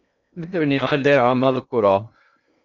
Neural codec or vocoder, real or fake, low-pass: codec, 16 kHz in and 24 kHz out, 0.6 kbps, FocalCodec, streaming, 2048 codes; fake; 7.2 kHz